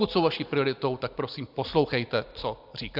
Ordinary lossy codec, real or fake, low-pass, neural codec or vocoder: AAC, 48 kbps; real; 5.4 kHz; none